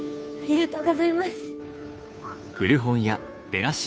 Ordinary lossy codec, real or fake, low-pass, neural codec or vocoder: none; fake; none; codec, 16 kHz, 2 kbps, FunCodec, trained on Chinese and English, 25 frames a second